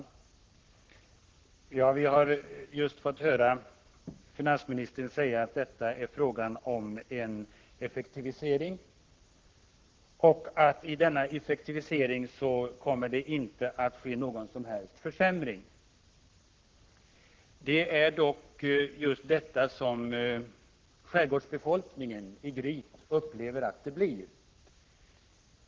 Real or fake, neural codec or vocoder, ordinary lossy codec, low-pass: fake; codec, 44.1 kHz, 7.8 kbps, Pupu-Codec; Opus, 16 kbps; 7.2 kHz